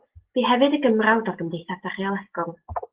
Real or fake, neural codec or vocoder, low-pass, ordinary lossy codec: real; none; 3.6 kHz; Opus, 16 kbps